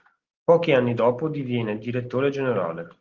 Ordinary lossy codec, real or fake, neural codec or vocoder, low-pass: Opus, 16 kbps; real; none; 7.2 kHz